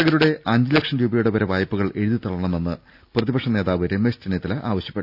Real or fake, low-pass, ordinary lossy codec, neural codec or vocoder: real; 5.4 kHz; none; none